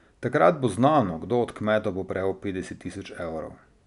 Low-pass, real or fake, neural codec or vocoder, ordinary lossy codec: 10.8 kHz; real; none; none